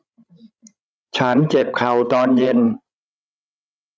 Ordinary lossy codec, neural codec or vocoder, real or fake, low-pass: none; codec, 16 kHz, 16 kbps, FreqCodec, larger model; fake; none